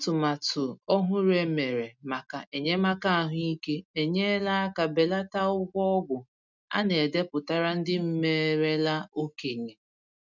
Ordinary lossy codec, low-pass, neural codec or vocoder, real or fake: none; 7.2 kHz; none; real